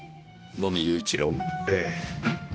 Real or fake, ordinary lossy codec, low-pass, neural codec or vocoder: fake; none; none; codec, 16 kHz, 1 kbps, X-Codec, HuBERT features, trained on balanced general audio